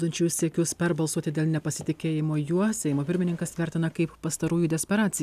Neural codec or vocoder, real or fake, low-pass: none; real; 14.4 kHz